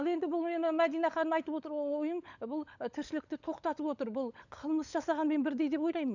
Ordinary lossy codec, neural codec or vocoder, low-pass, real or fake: none; codec, 16 kHz, 16 kbps, FunCodec, trained on LibriTTS, 50 frames a second; 7.2 kHz; fake